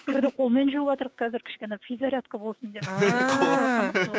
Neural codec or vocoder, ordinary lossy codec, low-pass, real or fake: codec, 16 kHz, 6 kbps, DAC; none; none; fake